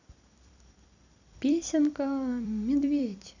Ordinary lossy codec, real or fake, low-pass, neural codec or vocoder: none; fake; 7.2 kHz; vocoder, 44.1 kHz, 128 mel bands every 256 samples, BigVGAN v2